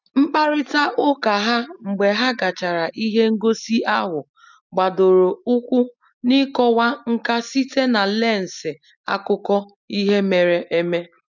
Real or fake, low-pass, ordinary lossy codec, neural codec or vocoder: real; 7.2 kHz; none; none